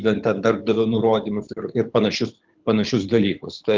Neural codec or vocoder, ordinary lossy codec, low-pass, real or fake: codec, 24 kHz, 6 kbps, HILCodec; Opus, 32 kbps; 7.2 kHz; fake